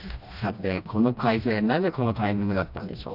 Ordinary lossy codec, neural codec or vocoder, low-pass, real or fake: none; codec, 16 kHz, 1 kbps, FreqCodec, smaller model; 5.4 kHz; fake